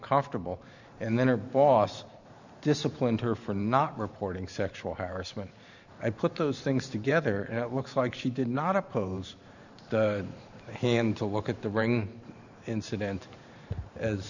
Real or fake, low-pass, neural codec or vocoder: fake; 7.2 kHz; vocoder, 44.1 kHz, 128 mel bands every 512 samples, BigVGAN v2